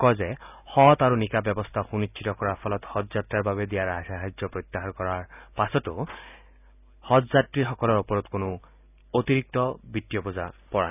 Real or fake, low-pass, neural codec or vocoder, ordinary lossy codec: real; 3.6 kHz; none; none